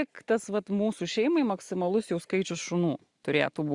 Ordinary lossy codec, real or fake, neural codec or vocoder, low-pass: Opus, 64 kbps; real; none; 10.8 kHz